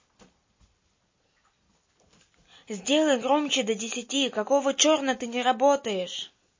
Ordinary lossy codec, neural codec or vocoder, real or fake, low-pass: MP3, 32 kbps; none; real; 7.2 kHz